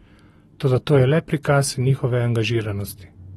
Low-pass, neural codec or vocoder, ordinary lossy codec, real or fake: 19.8 kHz; none; AAC, 32 kbps; real